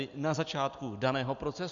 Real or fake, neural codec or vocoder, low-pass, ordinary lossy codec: real; none; 7.2 kHz; Opus, 64 kbps